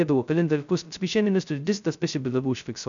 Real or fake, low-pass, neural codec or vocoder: fake; 7.2 kHz; codec, 16 kHz, 0.2 kbps, FocalCodec